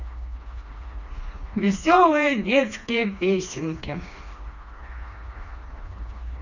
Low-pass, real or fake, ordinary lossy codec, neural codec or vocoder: 7.2 kHz; fake; none; codec, 16 kHz, 2 kbps, FreqCodec, smaller model